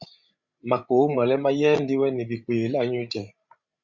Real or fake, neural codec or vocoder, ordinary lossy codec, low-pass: fake; codec, 16 kHz, 16 kbps, FreqCodec, larger model; Opus, 64 kbps; 7.2 kHz